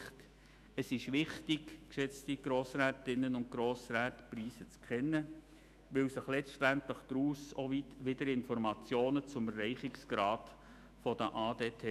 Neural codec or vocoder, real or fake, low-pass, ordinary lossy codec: autoencoder, 48 kHz, 128 numbers a frame, DAC-VAE, trained on Japanese speech; fake; 14.4 kHz; none